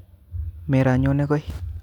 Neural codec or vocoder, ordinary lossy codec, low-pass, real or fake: none; none; 19.8 kHz; real